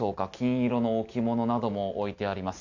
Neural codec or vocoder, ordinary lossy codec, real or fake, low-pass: none; none; real; 7.2 kHz